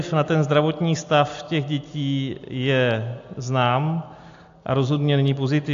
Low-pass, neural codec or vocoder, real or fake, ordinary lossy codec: 7.2 kHz; none; real; AAC, 64 kbps